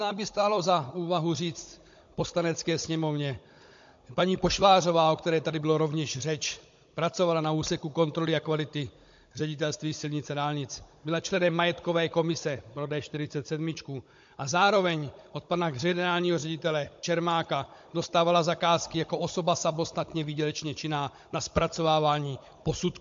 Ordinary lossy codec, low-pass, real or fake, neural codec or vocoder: MP3, 48 kbps; 7.2 kHz; fake; codec, 16 kHz, 16 kbps, FunCodec, trained on Chinese and English, 50 frames a second